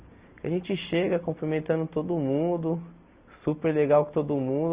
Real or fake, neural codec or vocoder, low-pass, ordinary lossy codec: real; none; 3.6 kHz; Opus, 64 kbps